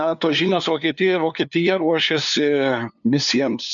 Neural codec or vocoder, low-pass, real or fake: codec, 16 kHz, 2 kbps, FunCodec, trained on LibriTTS, 25 frames a second; 7.2 kHz; fake